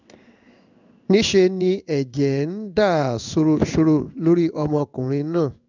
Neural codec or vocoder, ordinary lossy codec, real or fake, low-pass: codec, 16 kHz in and 24 kHz out, 1 kbps, XY-Tokenizer; none; fake; 7.2 kHz